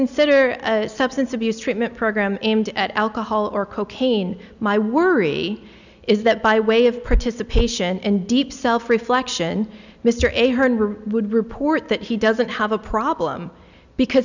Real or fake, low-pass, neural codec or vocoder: real; 7.2 kHz; none